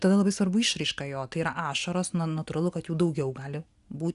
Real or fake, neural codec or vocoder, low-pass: real; none; 10.8 kHz